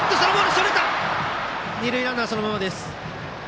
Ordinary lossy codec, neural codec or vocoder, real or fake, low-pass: none; none; real; none